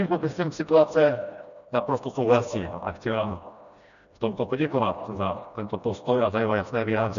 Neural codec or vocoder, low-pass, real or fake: codec, 16 kHz, 1 kbps, FreqCodec, smaller model; 7.2 kHz; fake